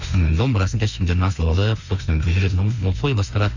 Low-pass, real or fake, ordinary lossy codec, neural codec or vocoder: 7.2 kHz; fake; none; autoencoder, 48 kHz, 32 numbers a frame, DAC-VAE, trained on Japanese speech